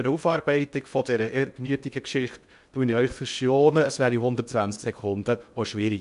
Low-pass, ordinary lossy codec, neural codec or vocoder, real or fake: 10.8 kHz; none; codec, 16 kHz in and 24 kHz out, 0.6 kbps, FocalCodec, streaming, 2048 codes; fake